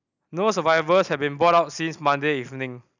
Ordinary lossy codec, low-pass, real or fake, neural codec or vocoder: none; 7.2 kHz; real; none